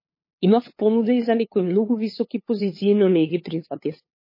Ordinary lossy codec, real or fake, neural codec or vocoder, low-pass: MP3, 24 kbps; fake; codec, 16 kHz, 8 kbps, FunCodec, trained on LibriTTS, 25 frames a second; 5.4 kHz